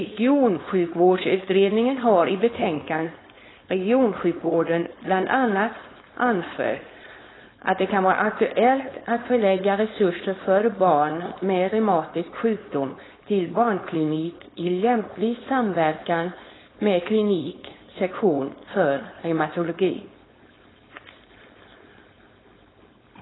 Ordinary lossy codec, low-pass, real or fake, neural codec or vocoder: AAC, 16 kbps; 7.2 kHz; fake; codec, 16 kHz, 4.8 kbps, FACodec